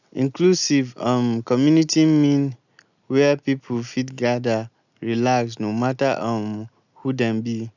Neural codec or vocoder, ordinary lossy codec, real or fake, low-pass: none; none; real; 7.2 kHz